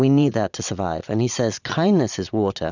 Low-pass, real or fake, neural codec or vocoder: 7.2 kHz; fake; vocoder, 22.05 kHz, 80 mel bands, Vocos